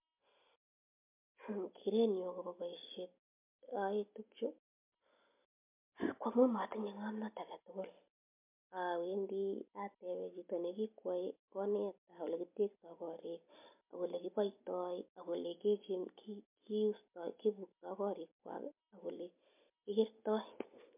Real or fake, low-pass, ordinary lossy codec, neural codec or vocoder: real; 3.6 kHz; MP3, 32 kbps; none